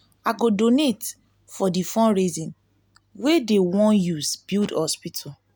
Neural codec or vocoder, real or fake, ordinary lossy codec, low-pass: none; real; none; none